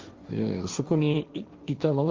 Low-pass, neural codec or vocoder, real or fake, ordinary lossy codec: 7.2 kHz; codec, 16 kHz, 1.1 kbps, Voila-Tokenizer; fake; Opus, 32 kbps